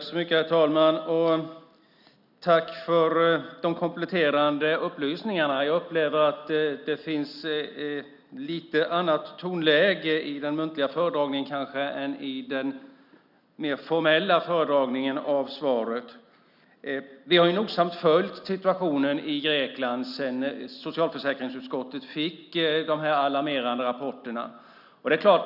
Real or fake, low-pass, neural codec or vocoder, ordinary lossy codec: real; 5.4 kHz; none; none